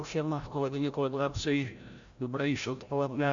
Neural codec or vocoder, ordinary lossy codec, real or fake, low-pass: codec, 16 kHz, 0.5 kbps, FreqCodec, larger model; AAC, 48 kbps; fake; 7.2 kHz